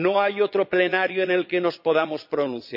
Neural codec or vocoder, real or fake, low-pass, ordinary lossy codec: vocoder, 22.05 kHz, 80 mel bands, Vocos; fake; 5.4 kHz; none